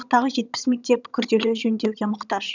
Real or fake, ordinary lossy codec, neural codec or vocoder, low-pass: fake; none; vocoder, 22.05 kHz, 80 mel bands, HiFi-GAN; 7.2 kHz